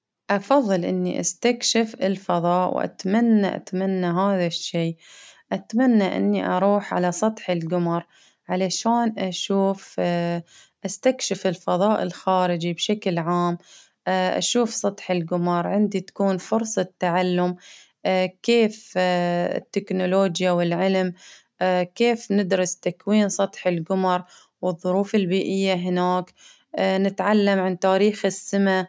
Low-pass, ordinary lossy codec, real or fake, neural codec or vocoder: none; none; real; none